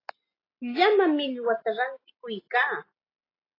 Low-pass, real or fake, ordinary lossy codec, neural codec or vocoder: 5.4 kHz; real; AAC, 24 kbps; none